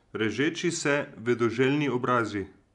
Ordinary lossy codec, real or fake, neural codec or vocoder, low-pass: none; real; none; 10.8 kHz